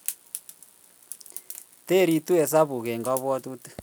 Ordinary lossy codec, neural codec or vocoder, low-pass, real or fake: none; none; none; real